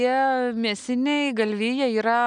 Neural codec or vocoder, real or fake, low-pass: none; real; 9.9 kHz